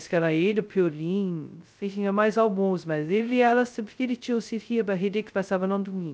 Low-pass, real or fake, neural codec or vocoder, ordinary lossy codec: none; fake; codec, 16 kHz, 0.2 kbps, FocalCodec; none